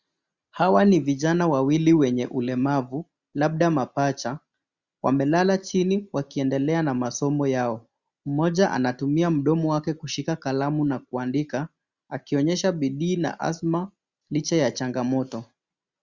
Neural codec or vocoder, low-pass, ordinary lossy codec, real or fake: none; 7.2 kHz; Opus, 64 kbps; real